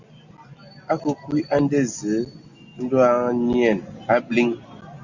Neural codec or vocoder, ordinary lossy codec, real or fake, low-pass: none; Opus, 64 kbps; real; 7.2 kHz